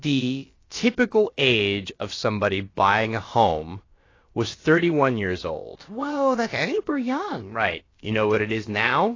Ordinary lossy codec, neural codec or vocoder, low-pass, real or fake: AAC, 32 kbps; codec, 16 kHz, about 1 kbps, DyCAST, with the encoder's durations; 7.2 kHz; fake